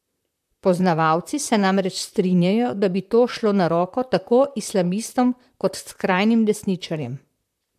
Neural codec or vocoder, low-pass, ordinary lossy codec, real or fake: vocoder, 44.1 kHz, 128 mel bands, Pupu-Vocoder; 14.4 kHz; MP3, 96 kbps; fake